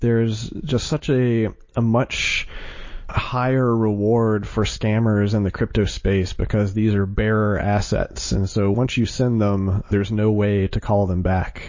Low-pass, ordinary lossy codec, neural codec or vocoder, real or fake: 7.2 kHz; MP3, 32 kbps; none; real